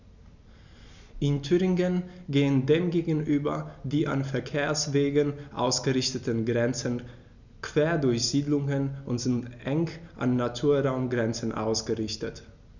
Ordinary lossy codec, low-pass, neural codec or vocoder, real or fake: none; 7.2 kHz; none; real